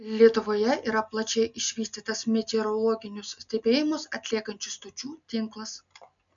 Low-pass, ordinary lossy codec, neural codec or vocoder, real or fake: 7.2 kHz; MP3, 96 kbps; none; real